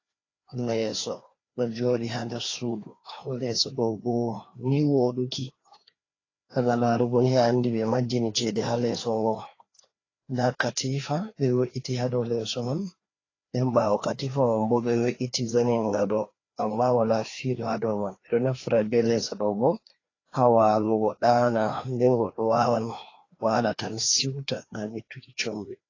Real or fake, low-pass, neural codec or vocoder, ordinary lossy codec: fake; 7.2 kHz; codec, 16 kHz, 2 kbps, FreqCodec, larger model; AAC, 32 kbps